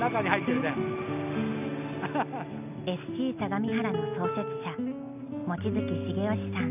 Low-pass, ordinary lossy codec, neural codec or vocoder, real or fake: 3.6 kHz; none; none; real